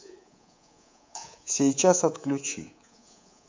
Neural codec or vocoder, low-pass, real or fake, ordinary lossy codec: codec, 24 kHz, 3.1 kbps, DualCodec; 7.2 kHz; fake; MP3, 64 kbps